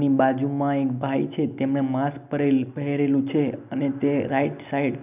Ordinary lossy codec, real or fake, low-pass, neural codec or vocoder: none; real; 3.6 kHz; none